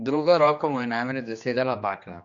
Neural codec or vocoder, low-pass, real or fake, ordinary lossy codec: codec, 16 kHz, 2 kbps, X-Codec, HuBERT features, trained on general audio; 7.2 kHz; fake; Opus, 64 kbps